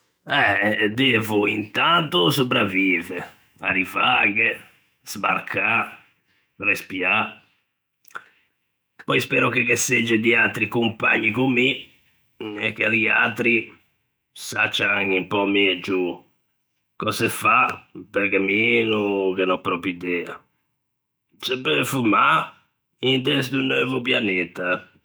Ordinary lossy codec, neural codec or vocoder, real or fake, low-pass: none; autoencoder, 48 kHz, 128 numbers a frame, DAC-VAE, trained on Japanese speech; fake; none